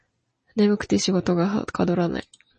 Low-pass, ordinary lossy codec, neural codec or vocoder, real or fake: 9.9 kHz; MP3, 32 kbps; none; real